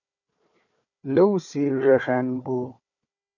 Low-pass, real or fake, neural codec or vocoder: 7.2 kHz; fake; codec, 16 kHz, 4 kbps, FunCodec, trained on Chinese and English, 50 frames a second